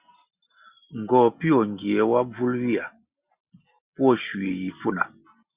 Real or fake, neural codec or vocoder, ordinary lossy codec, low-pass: real; none; Opus, 64 kbps; 3.6 kHz